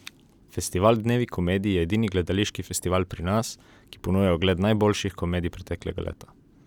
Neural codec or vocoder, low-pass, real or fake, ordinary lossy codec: none; 19.8 kHz; real; none